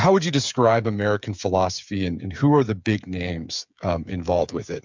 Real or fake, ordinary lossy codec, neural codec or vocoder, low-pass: fake; MP3, 64 kbps; codec, 16 kHz, 8 kbps, FreqCodec, smaller model; 7.2 kHz